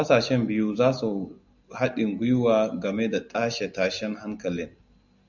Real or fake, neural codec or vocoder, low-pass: real; none; 7.2 kHz